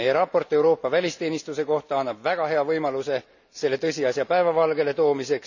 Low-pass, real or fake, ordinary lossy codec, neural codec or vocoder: 7.2 kHz; real; none; none